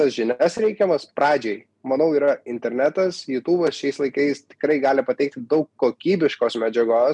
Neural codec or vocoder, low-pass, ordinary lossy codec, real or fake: none; 10.8 kHz; MP3, 96 kbps; real